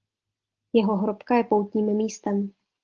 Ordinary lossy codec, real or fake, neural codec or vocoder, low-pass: Opus, 16 kbps; real; none; 7.2 kHz